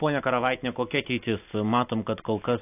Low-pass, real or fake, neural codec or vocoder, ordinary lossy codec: 3.6 kHz; real; none; AAC, 32 kbps